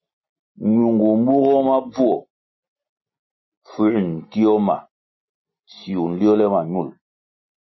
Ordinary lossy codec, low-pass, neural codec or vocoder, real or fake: MP3, 24 kbps; 7.2 kHz; none; real